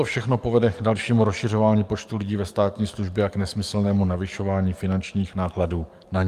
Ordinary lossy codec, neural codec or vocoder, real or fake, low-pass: Opus, 32 kbps; codec, 44.1 kHz, 7.8 kbps, DAC; fake; 14.4 kHz